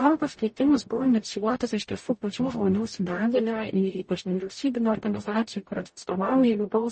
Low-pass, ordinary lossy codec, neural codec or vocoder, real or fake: 10.8 kHz; MP3, 32 kbps; codec, 44.1 kHz, 0.9 kbps, DAC; fake